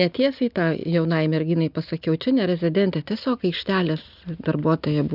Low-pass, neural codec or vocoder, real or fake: 5.4 kHz; none; real